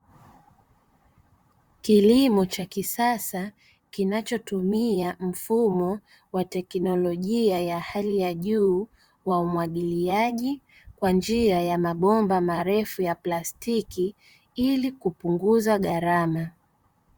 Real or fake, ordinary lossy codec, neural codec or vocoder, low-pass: fake; Opus, 64 kbps; vocoder, 44.1 kHz, 128 mel bands, Pupu-Vocoder; 19.8 kHz